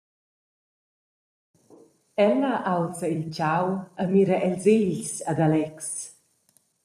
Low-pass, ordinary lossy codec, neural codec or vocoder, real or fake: 14.4 kHz; MP3, 96 kbps; vocoder, 44.1 kHz, 128 mel bands every 256 samples, BigVGAN v2; fake